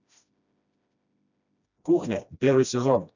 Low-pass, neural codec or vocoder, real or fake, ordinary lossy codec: 7.2 kHz; codec, 16 kHz, 1 kbps, FreqCodec, smaller model; fake; none